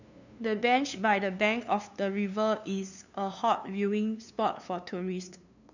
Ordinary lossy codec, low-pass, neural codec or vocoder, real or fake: none; 7.2 kHz; codec, 16 kHz, 2 kbps, FunCodec, trained on LibriTTS, 25 frames a second; fake